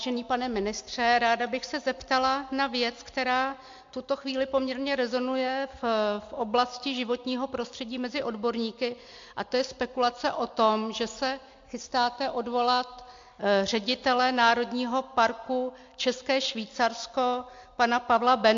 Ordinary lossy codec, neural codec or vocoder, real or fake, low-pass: MP3, 48 kbps; none; real; 7.2 kHz